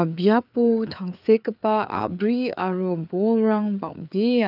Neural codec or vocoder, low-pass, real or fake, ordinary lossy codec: codec, 16 kHz, 4 kbps, FreqCodec, larger model; 5.4 kHz; fake; none